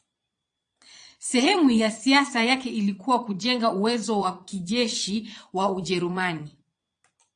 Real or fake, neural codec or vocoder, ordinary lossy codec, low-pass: fake; vocoder, 22.05 kHz, 80 mel bands, Vocos; AAC, 64 kbps; 9.9 kHz